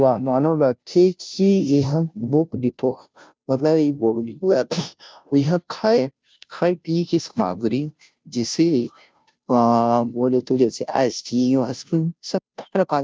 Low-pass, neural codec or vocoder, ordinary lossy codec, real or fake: none; codec, 16 kHz, 0.5 kbps, FunCodec, trained on Chinese and English, 25 frames a second; none; fake